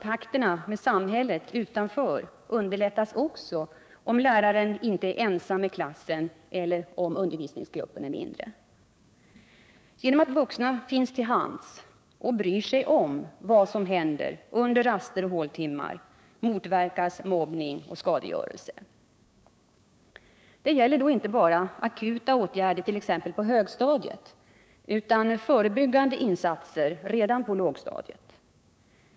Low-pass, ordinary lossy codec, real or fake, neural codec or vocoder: none; none; fake; codec, 16 kHz, 6 kbps, DAC